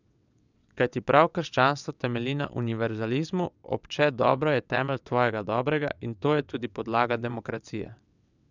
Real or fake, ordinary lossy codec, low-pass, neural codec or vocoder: fake; none; 7.2 kHz; vocoder, 22.05 kHz, 80 mel bands, WaveNeXt